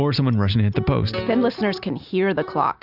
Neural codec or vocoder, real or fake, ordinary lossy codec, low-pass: none; real; Opus, 64 kbps; 5.4 kHz